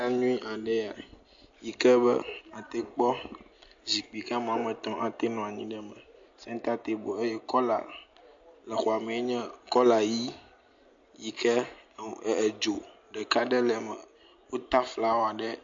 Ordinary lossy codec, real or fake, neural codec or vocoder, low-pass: MP3, 48 kbps; real; none; 7.2 kHz